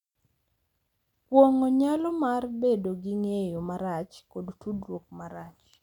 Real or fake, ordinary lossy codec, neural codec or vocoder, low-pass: real; none; none; 19.8 kHz